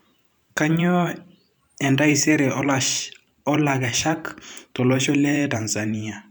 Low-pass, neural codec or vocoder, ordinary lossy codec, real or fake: none; vocoder, 44.1 kHz, 128 mel bands every 512 samples, BigVGAN v2; none; fake